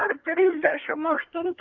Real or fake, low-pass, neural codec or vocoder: fake; 7.2 kHz; codec, 24 kHz, 1 kbps, SNAC